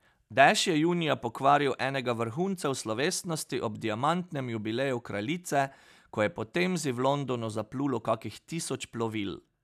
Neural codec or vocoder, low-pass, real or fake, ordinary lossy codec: none; 14.4 kHz; real; none